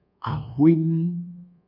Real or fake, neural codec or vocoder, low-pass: fake; codec, 24 kHz, 1.2 kbps, DualCodec; 5.4 kHz